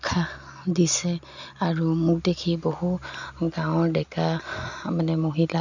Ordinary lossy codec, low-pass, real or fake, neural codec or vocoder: none; 7.2 kHz; real; none